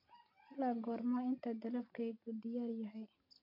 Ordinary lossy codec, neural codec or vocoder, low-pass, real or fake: MP3, 32 kbps; none; 5.4 kHz; real